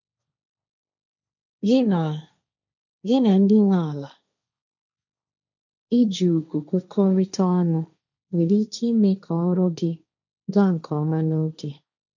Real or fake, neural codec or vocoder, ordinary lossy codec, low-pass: fake; codec, 16 kHz, 1.1 kbps, Voila-Tokenizer; none; 7.2 kHz